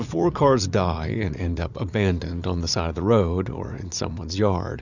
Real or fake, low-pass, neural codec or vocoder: fake; 7.2 kHz; vocoder, 44.1 kHz, 80 mel bands, Vocos